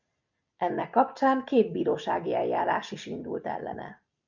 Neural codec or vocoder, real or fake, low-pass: none; real; 7.2 kHz